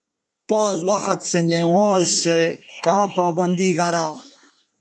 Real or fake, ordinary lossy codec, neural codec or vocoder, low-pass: fake; MP3, 96 kbps; codec, 24 kHz, 1 kbps, SNAC; 9.9 kHz